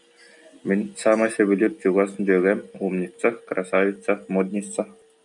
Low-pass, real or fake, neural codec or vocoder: 10.8 kHz; real; none